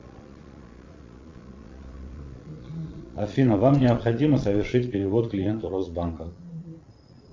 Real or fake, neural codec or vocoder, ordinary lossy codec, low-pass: fake; vocoder, 22.05 kHz, 80 mel bands, Vocos; MP3, 64 kbps; 7.2 kHz